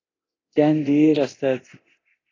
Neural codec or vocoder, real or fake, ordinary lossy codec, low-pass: codec, 24 kHz, 0.9 kbps, DualCodec; fake; AAC, 32 kbps; 7.2 kHz